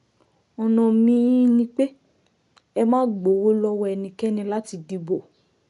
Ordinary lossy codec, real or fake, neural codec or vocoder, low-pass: MP3, 96 kbps; real; none; 10.8 kHz